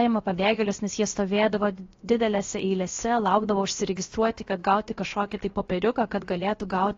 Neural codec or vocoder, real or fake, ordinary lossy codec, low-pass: codec, 16 kHz, about 1 kbps, DyCAST, with the encoder's durations; fake; AAC, 32 kbps; 7.2 kHz